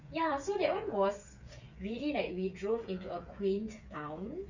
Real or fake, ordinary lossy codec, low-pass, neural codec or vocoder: fake; none; 7.2 kHz; codec, 16 kHz, 8 kbps, FreqCodec, smaller model